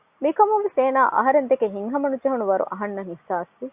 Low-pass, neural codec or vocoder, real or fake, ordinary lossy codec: 3.6 kHz; none; real; Opus, 64 kbps